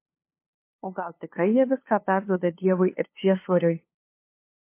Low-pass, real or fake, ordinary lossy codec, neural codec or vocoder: 3.6 kHz; fake; MP3, 24 kbps; codec, 16 kHz, 2 kbps, FunCodec, trained on LibriTTS, 25 frames a second